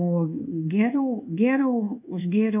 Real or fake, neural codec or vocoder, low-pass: fake; codec, 24 kHz, 1.2 kbps, DualCodec; 3.6 kHz